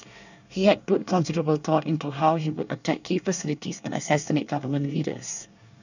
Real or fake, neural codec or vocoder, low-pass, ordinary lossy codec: fake; codec, 24 kHz, 1 kbps, SNAC; 7.2 kHz; none